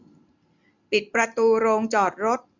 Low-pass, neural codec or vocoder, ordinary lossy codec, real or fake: 7.2 kHz; none; none; real